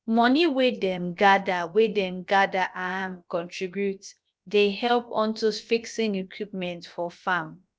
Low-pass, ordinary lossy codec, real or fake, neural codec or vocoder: none; none; fake; codec, 16 kHz, about 1 kbps, DyCAST, with the encoder's durations